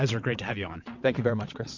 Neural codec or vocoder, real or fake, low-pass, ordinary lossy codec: vocoder, 22.05 kHz, 80 mel bands, WaveNeXt; fake; 7.2 kHz; MP3, 48 kbps